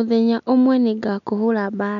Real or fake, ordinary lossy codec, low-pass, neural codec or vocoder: real; none; 7.2 kHz; none